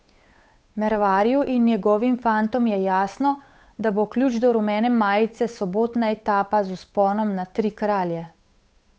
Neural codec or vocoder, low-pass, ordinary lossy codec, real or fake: codec, 16 kHz, 8 kbps, FunCodec, trained on Chinese and English, 25 frames a second; none; none; fake